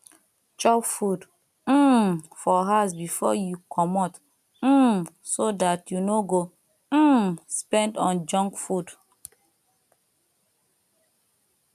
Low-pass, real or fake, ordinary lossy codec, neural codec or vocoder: 14.4 kHz; real; none; none